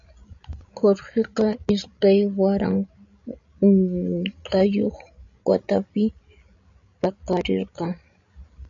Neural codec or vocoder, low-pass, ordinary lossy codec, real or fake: codec, 16 kHz, 16 kbps, FreqCodec, larger model; 7.2 kHz; MP3, 64 kbps; fake